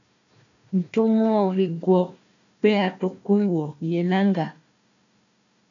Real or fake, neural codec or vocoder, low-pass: fake; codec, 16 kHz, 1 kbps, FunCodec, trained on Chinese and English, 50 frames a second; 7.2 kHz